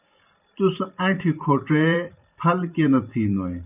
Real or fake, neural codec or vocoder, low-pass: fake; vocoder, 44.1 kHz, 128 mel bands every 512 samples, BigVGAN v2; 3.6 kHz